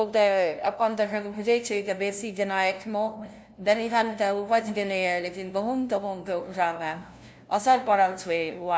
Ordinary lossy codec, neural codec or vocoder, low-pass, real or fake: none; codec, 16 kHz, 0.5 kbps, FunCodec, trained on LibriTTS, 25 frames a second; none; fake